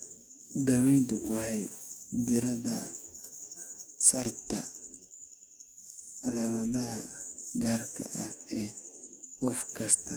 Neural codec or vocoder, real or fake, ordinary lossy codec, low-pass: codec, 44.1 kHz, 2.6 kbps, DAC; fake; none; none